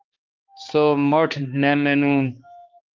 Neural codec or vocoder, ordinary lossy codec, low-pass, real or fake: codec, 16 kHz, 2 kbps, X-Codec, HuBERT features, trained on balanced general audio; Opus, 32 kbps; 7.2 kHz; fake